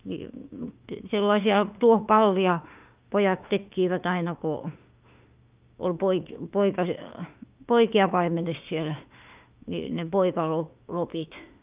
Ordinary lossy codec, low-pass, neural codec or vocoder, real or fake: Opus, 24 kbps; 3.6 kHz; autoencoder, 48 kHz, 32 numbers a frame, DAC-VAE, trained on Japanese speech; fake